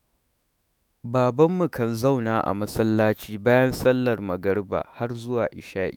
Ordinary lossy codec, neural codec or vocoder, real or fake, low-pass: none; autoencoder, 48 kHz, 128 numbers a frame, DAC-VAE, trained on Japanese speech; fake; none